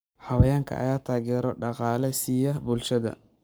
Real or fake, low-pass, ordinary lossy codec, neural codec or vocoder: fake; none; none; codec, 44.1 kHz, 7.8 kbps, Pupu-Codec